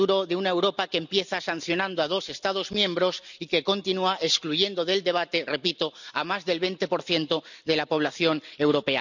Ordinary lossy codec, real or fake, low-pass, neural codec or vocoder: none; real; 7.2 kHz; none